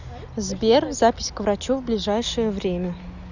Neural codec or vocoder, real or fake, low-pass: none; real; 7.2 kHz